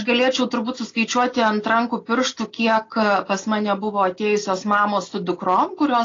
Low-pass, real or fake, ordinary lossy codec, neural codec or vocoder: 7.2 kHz; real; AAC, 32 kbps; none